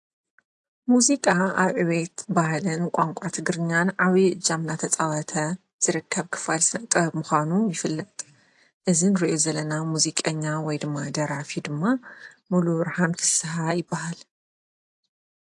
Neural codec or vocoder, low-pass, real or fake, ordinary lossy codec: none; 10.8 kHz; real; AAC, 64 kbps